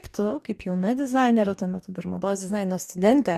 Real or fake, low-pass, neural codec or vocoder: fake; 14.4 kHz; codec, 44.1 kHz, 2.6 kbps, DAC